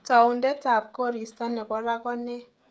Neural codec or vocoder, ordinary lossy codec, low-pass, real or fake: codec, 16 kHz, 16 kbps, FreqCodec, smaller model; none; none; fake